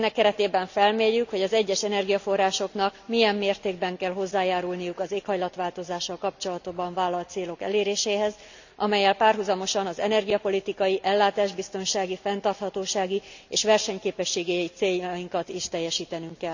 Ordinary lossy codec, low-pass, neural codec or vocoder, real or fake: none; 7.2 kHz; none; real